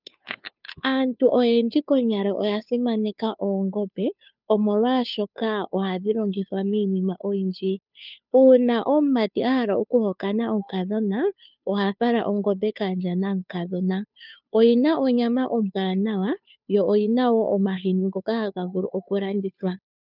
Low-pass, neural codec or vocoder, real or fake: 5.4 kHz; codec, 16 kHz, 2 kbps, FunCodec, trained on Chinese and English, 25 frames a second; fake